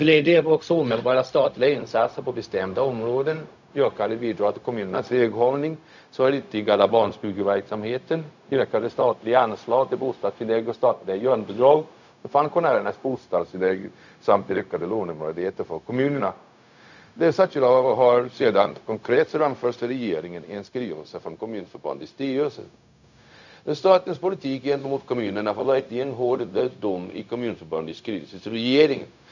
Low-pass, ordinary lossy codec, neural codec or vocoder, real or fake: 7.2 kHz; none; codec, 16 kHz, 0.4 kbps, LongCat-Audio-Codec; fake